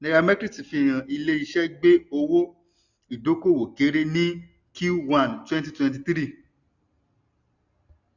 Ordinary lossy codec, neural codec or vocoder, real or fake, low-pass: none; none; real; 7.2 kHz